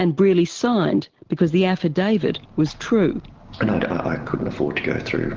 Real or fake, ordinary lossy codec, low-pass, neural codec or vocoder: real; Opus, 16 kbps; 7.2 kHz; none